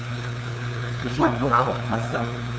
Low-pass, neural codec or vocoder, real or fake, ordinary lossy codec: none; codec, 16 kHz, 2 kbps, FunCodec, trained on LibriTTS, 25 frames a second; fake; none